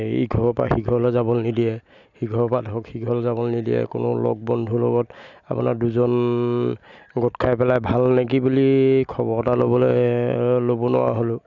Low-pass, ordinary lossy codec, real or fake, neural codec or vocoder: 7.2 kHz; none; real; none